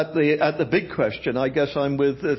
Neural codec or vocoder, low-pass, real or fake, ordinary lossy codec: none; 7.2 kHz; real; MP3, 24 kbps